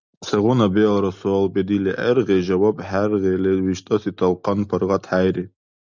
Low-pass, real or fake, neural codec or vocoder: 7.2 kHz; real; none